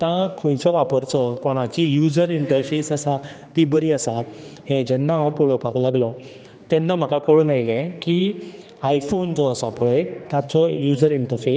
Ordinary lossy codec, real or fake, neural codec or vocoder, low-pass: none; fake; codec, 16 kHz, 2 kbps, X-Codec, HuBERT features, trained on general audio; none